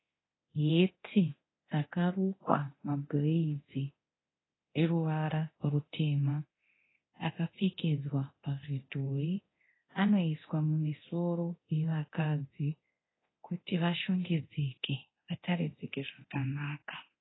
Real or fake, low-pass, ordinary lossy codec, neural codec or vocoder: fake; 7.2 kHz; AAC, 16 kbps; codec, 24 kHz, 0.9 kbps, DualCodec